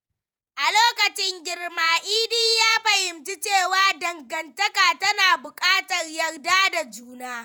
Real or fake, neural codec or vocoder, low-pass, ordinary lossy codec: fake; vocoder, 48 kHz, 128 mel bands, Vocos; none; none